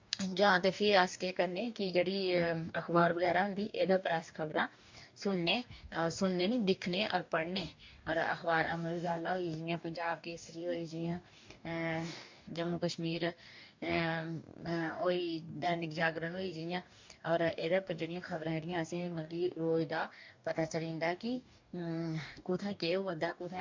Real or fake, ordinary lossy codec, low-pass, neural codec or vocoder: fake; MP3, 64 kbps; 7.2 kHz; codec, 44.1 kHz, 2.6 kbps, DAC